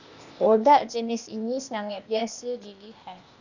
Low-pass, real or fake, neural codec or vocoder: 7.2 kHz; fake; codec, 16 kHz, 0.8 kbps, ZipCodec